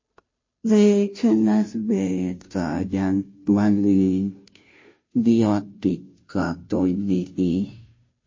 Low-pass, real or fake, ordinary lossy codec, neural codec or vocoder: 7.2 kHz; fake; MP3, 32 kbps; codec, 16 kHz, 0.5 kbps, FunCodec, trained on Chinese and English, 25 frames a second